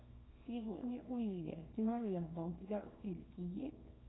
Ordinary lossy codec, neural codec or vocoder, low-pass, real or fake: AAC, 16 kbps; codec, 24 kHz, 0.9 kbps, WavTokenizer, small release; 7.2 kHz; fake